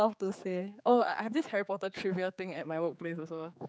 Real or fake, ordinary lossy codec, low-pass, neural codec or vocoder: fake; none; none; codec, 16 kHz, 4 kbps, X-Codec, HuBERT features, trained on general audio